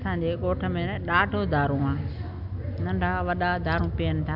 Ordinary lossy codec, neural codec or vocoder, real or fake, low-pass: none; none; real; 5.4 kHz